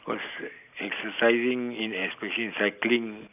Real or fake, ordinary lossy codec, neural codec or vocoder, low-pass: real; none; none; 3.6 kHz